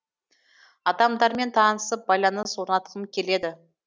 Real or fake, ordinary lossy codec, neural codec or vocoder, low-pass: real; none; none; none